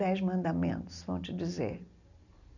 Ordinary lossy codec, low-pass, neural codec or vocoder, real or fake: none; 7.2 kHz; none; real